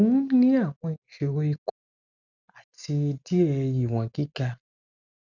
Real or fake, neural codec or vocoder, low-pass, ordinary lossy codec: real; none; 7.2 kHz; none